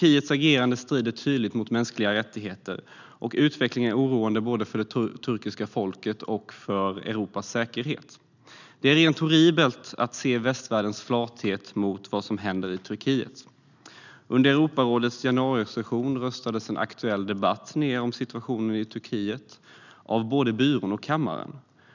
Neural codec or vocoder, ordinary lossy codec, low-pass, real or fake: none; none; 7.2 kHz; real